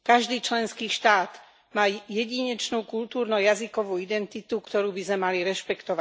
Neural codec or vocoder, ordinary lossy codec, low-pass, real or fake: none; none; none; real